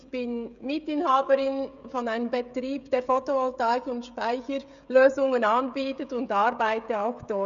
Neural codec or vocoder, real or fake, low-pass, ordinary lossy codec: codec, 16 kHz, 16 kbps, FreqCodec, smaller model; fake; 7.2 kHz; none